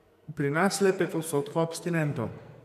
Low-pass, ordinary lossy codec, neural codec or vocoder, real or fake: 14.4 kHz; none; codec, 44.1 kHz, 2.6 kbps, SNAC; fake